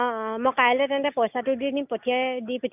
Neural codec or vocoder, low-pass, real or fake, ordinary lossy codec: none; 3.6 kHz; real; none